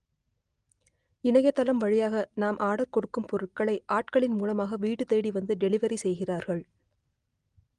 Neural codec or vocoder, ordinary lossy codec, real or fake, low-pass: none; Opus, 24 kbps; real; 9.9 kHz